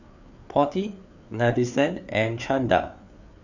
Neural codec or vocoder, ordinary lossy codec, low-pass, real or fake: codec, 16 kHz, 4 kbps, FreqCodec, larger model; none; 7.2 kHz; fake